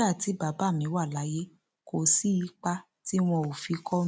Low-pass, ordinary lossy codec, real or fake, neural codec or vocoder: none; none; real; none